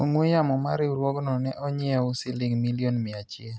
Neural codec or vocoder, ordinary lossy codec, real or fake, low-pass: none; none; real; none